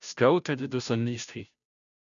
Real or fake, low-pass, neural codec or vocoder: fake; 7.2 kHz; codec, 16 kHz, 0.5 kbps, FunCodec, trained on Chinese and English, 25 frames a second